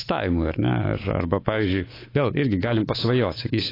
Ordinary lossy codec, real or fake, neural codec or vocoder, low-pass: AAC, 24 kbps; fake; codec, 24 kHz, 3.1 kbps, DualCodec; 5.4 kHz